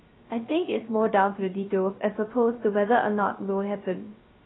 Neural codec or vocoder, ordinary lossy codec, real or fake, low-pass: codec, 16 kHz, 0.3 kbps, FocalCodec; AAC, 16 kbps; fake; 7.2 kHz